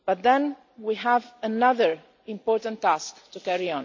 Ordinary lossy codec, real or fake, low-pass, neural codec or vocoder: MP3, 64 kbps; real; 7.2 kHz; none